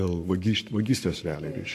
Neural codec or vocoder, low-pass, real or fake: codec, 44.1 kHz, 7.8 kbps, Pupu-Codec; 14.4 kHz; fake